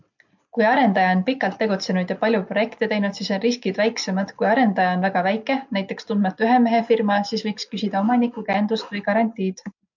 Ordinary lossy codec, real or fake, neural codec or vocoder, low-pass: MP3, 64 kbps; real; none; 7.2 kHz